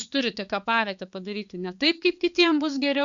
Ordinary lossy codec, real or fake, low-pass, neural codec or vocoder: Opus, 64 kbps; fake; 7.2 kHz; codec, 16 kHz, 4 kbps, X-Codec, HuBERT features, trained on balanced general audio